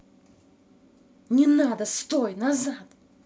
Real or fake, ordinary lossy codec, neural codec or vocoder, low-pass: real; none; none; none